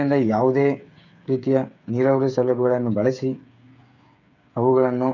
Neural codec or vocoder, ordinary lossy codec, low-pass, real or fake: codec, 16 kHz, 8 kbps, FreqCodec, smaller model; none; 7.2 kHz; fake